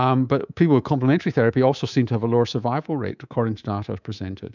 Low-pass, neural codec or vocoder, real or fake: 7.2 kHz; codec, 24 kHz, 3.1 kbps, DualCodec; fake